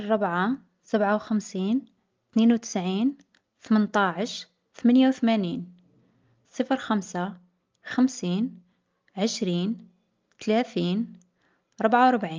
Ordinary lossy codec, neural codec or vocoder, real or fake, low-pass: Opus, 24 kbps; none; real; 7.2 kHz